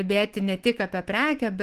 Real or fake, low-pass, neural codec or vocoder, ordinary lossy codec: fake; 14.4 kHz; autoencoder, 48 kHz, 128 numbers a frame, DAC-VAE, trained on Japanese speech; Opus, 16 kbps